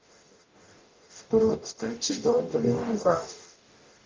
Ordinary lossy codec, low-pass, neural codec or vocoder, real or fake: Opus, 32 kbps; 7.2 kHz; codec, 44.1 kHz, 0.9 kbps, DAC; fake